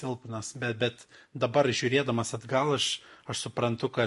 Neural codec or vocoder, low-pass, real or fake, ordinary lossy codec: none; 14.4 kHz; real; MP3, 48 kbps